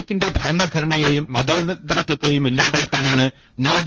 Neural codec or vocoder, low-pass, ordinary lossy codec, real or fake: codec, 16 kHz, 1.1 kbps, Voila-Tokenizer; 7.2 kHz; Opus, 24 kbps; fake